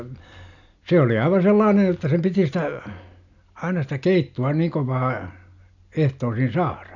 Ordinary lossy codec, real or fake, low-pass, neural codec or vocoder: none; real; 7.2 kHz; none